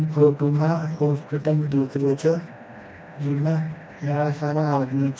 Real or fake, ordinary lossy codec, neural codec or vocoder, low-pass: fake; none; codec, 16 kHz, 1 kbps, FreqCodec, smaller model; none